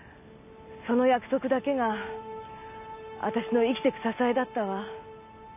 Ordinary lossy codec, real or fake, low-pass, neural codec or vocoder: none; real; 3.6 kHz; none